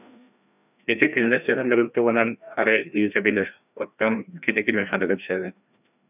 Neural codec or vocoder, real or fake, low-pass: codec, 16 kHz, 1 kbps, FreqCodec, larger model; fake; 3.6 kHz